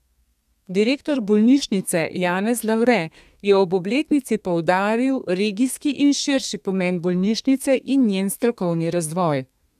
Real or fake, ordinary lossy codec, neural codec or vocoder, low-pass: fake; none; codec, 32 kHz, 1.9 kbps, SNAC; 14.4 kHz